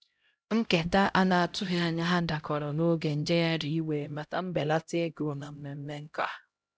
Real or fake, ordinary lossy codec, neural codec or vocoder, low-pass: fake; none; codec, 16 kHz, 0.5 kbps, X-Codec, HuBERT features, trained on LibriSpeech; none